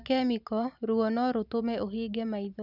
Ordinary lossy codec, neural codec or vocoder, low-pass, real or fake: none; vocoder, 44.1 kHz, 128 mel bands every 512 samples, BigVGAN v2; 5.4 kHz; fake